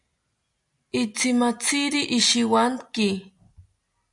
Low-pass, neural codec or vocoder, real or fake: 10.8 kHz; none; real